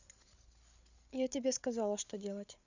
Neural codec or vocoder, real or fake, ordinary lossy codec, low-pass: codec, 16 kHz, 8 kbps, FreqCodec, larger model; fake; none; 7.2 kHz